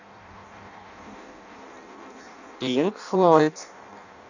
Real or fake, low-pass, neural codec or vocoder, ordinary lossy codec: fake; 7.2 kHz; codec, 16 kHz in and 24 kHz out, 0.6 kbps, FireRedTTS-2 codec; none